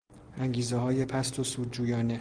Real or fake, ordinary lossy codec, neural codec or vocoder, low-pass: real; Opus, 24 kbps; none; 9.9 kHz